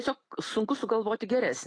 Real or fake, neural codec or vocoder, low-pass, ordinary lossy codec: real; none; 9.9 kHz; AAC, 32 kbps